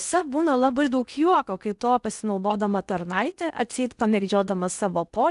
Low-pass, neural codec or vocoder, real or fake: 10.8 kHz; codec, 16 kHz in and 24 kHz out, 0.8 kbps, FocalCodec, streaming, 65536 codes; fake